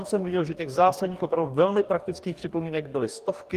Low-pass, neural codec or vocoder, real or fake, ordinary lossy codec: 14.4 kHz; codec, 44.1 kHz, 2.6 kbps, DAC; fake; Opus, 24 kbps